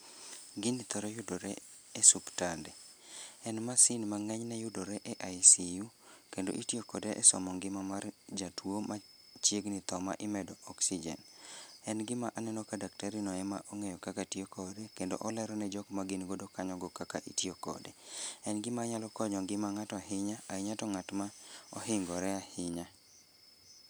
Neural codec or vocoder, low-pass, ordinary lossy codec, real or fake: none; none; none; real